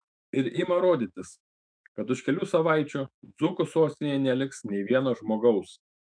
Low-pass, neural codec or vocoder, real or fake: 9.9 kHz; autoencoder, 48 kHz, 128 numbers a frame, DAC-VAE, trained on Japanese speech; fake